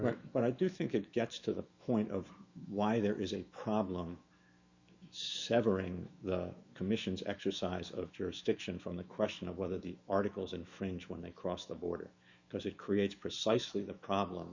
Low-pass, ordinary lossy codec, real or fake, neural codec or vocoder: 7.2 kHz; Opus, 64 kbps; fake; autoencoder, 48 kHz, 128 numbers a frame, DAC-VAE, trained on Japanese speech